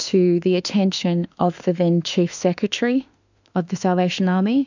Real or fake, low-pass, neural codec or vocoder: fake; 7.2 kHz; autoencoder, 48 kHz, 32 numbers a frame, DAC-VAE, trained on Japanese speech